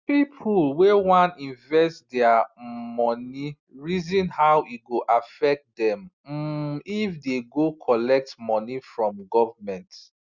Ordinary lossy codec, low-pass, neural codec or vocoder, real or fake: Opus, 64 kbps; 7.2 kHz; none; real